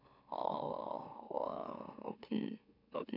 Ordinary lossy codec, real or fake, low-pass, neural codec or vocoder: none; fake; 5.4 kHz; autoencoder, 44.1 kHz, a latent of 192 numbers a frame, MeloTTS